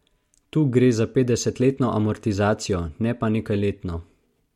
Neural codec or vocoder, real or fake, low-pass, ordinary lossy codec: none; real; 19.8 kHz; MP3, 64 kbps